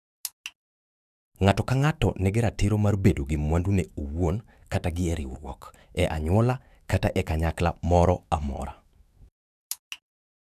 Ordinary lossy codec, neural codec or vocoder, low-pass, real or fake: none; autoencoder, 48 kHz, 128 numbers a frame, DAC-VAE, trained on Japanese speech; 14.4 kHz; fake